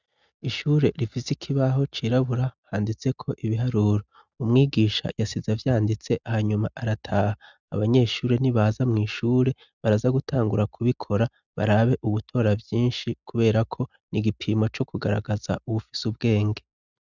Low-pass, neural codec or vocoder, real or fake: 7.2 kHz; none; real